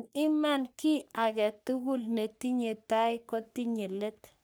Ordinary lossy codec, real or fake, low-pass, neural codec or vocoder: none; fake; none; codec, 44.1 kHz, 3.4 kbps, Pupu-Codec